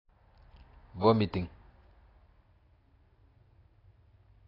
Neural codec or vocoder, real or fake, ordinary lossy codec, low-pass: none; real; AAC, 24 kbps; 5.4 kHz